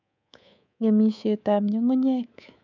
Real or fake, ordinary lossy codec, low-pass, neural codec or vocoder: fake; none; 7.2 kHz; codec, 24 kHz, 3.1 kbps, DualCodec